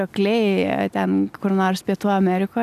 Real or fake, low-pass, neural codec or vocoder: real; 14.4 kHz; none